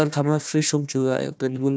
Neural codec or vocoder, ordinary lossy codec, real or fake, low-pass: codec, 16 kHz, 1 kbps, FunCodec, trained on Chinese and English, 50 frames a second; none; fake; none